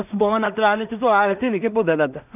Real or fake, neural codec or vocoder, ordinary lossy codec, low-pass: fake; codec, 16 kHz in and 24 kHz out, 0.4 kbps, LongCat-Audio-Codec, two codebook decoder; none; 3.6 kHz